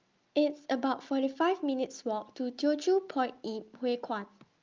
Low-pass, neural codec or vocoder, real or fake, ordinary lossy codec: 7.2 kHz; none; real; Opus, 24 kbps